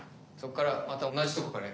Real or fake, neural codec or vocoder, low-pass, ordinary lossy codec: real; none; none; none